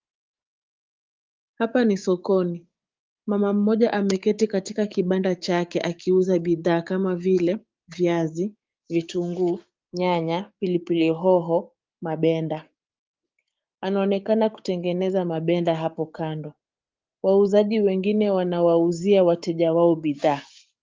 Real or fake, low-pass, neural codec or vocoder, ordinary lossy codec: fake; 7.2 kHz; codec, 16 kHz, 6 kbps, DAC; Opus, 32 kbps